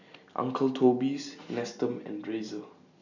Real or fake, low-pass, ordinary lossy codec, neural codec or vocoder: real; 7.2 kHz; none; none